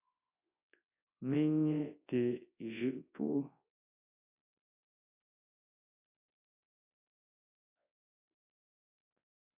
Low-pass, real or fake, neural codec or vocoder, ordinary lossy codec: 3.6 kHz; fake; codec, 24 kHz, 0.9 kbps, WavTokenizer, large speech release; AAC, 32 kbps